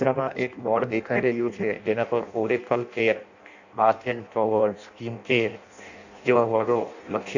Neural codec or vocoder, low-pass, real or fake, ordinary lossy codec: codec, 16 kHz in and 24 kHz out, 0.6 kbps, FireRedTTS-2 codec; 7.2 kHz; fake; none